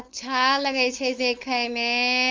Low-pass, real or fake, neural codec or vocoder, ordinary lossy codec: 7.2 kHz; fake; codec, 16 kHz, 4.8 kbps, FACodec; Opus, 32 kbps